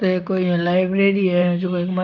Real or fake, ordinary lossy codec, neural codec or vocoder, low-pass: fake; none; vocoder, 44.1 kHz, 128 mel bands, Pupu-Vocoder; 7.2 kHz